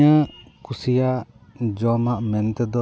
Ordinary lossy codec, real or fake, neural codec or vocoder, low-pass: none; real; none; none